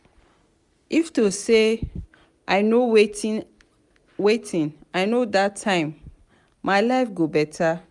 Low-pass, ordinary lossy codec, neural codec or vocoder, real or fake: 10.8 kHz; none; none; real